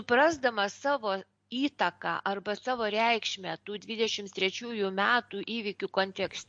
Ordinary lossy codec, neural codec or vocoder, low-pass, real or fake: MP3, 48 kbps; none; 9.9 kHz; real